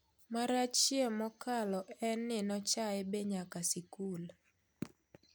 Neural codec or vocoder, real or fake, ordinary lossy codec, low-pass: none; real; none; none